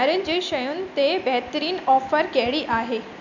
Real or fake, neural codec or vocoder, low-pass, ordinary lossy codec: real; none; 7.2 kHz; none